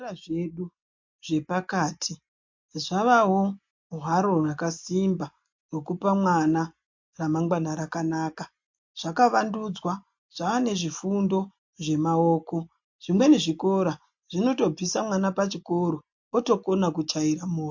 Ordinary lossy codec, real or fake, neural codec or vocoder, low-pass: MP3, 48 kbps; real; none; 7.2 kHz